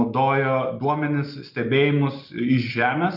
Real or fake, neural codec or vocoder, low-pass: real; none; 5.4 kHz